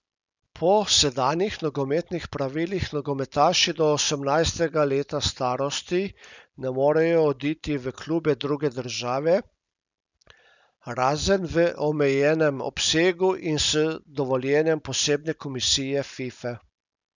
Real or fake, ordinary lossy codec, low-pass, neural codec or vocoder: real; none; 7.2 kHz; none